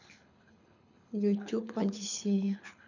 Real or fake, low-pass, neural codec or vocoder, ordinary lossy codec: fake; 7.2 kHz; codec, 24 kHz, 6 kbps, HILCodec; none